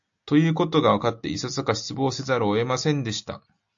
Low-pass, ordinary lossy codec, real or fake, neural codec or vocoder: 7.2 kHz; AAC, 64 kbps; real; none